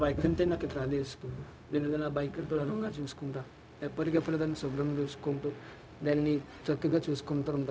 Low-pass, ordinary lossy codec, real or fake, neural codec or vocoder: none; none; fake; codec, 16 kHz, 0.4 kbps, LongCat-Audio-Codec